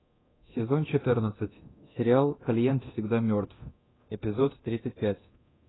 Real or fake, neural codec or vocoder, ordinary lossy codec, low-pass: fake; codec, 24 kHz, 0.9 kbps, DualCodec; AAC, 16 kbps; 7.2 kHz